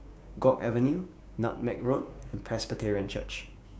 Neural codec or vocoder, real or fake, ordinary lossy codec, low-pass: codec, 16 kHz, 6 kbps, DAC; fake; none; none